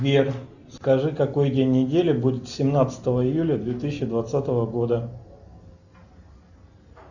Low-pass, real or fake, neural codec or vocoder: 7.2 kHz; real; none